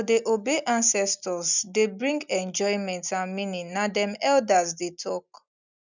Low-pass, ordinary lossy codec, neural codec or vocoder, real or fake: 7.2 kHz; none; none; real